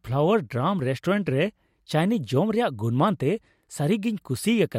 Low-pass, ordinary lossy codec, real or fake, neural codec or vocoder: 19.8 kHz; MP3, 64 kbps; real; none